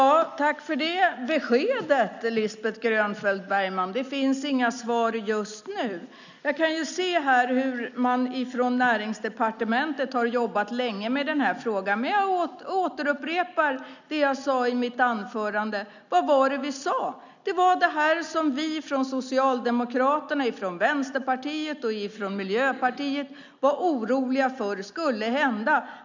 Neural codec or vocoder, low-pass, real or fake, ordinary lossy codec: none; 7.2 kHz; real; none